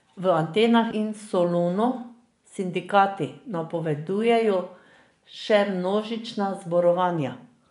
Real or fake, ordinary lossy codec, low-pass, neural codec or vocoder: fake; none; 10.8 kHz; vocoder, 24 kHz, 100 mel bands, Vocos